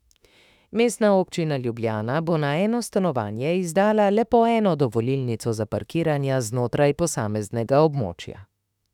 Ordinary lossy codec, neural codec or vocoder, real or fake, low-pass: none; autoencoder, 48 kHz, 32 numbers a frame, DAC-VAE, trained on Japanese speech; fake; 19.8 kHz